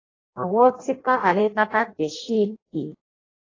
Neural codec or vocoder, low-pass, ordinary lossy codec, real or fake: codec, 16 kHz in and 24 kHz out, 0.6 kbps, FireRedTTS-2 codec; 7.2 kHz; AAC, 32 kbps; fake